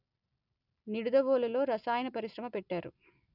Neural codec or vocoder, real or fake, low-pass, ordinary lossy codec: none; real; 5.4 kHz; none